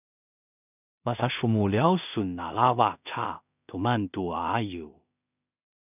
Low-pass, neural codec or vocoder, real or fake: 3.6 kHz; codec, 16 kHz in and 24 kHz out, 0.4 kbps, LongCat-Audio-Codec, two codebook decoder; fake